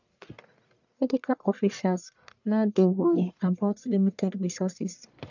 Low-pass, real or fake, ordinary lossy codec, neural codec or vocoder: 7.2 kHz; fake; none; codec, 44.1 kHz, 1.7 kbps, Pupu-Codec